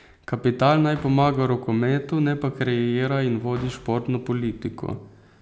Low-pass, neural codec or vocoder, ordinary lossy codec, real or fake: none; none; none; real